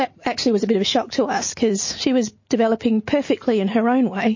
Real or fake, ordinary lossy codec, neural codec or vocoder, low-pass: real; MP3, 32 kbps; none; 7.2 kHz